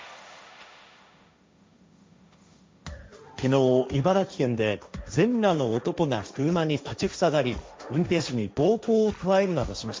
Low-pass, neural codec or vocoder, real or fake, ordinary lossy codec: none; codec, 16 kHz, 1.1 kbps, Voila-Tokenizer; fake; none